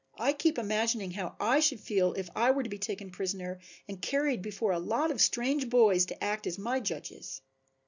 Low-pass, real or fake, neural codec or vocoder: 7.2 kHz; real; none